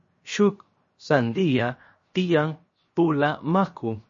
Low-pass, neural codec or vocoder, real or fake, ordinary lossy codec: 7.2 kHz; codec, 16 kHz, 0.7 kbps, FocalCodec; fake; MP3, 32 kbps